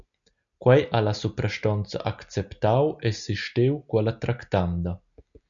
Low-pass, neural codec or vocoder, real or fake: 7.2 kHz; none; real